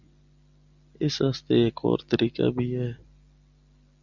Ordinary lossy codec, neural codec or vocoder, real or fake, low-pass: Opus, 64 kbps; none; real; 7.2 kHz